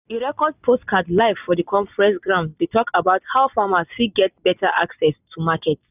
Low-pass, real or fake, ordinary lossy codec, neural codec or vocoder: 3.6 kHz; real; none; none